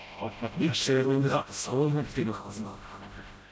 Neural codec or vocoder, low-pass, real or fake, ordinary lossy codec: codec, 16 kHz, 0.5 kbps, FreqCodec, smaller model; none; fake; none